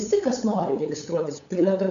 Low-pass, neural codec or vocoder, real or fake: 7.2 kHz; codec, 16 kHz, 8 kbps, FunCodec, trained on LibriTTS, 25 frames a second; fake